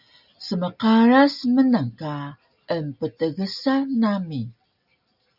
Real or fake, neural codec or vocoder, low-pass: real; none; 5.4 kHz